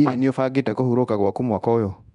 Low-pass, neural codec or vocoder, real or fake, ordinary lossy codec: 10.8 kHz; codec, 24 kHz, 0.9 kbps, DualCodec; fake; none